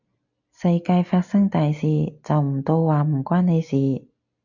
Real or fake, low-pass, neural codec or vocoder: real; 7.2 kHz; none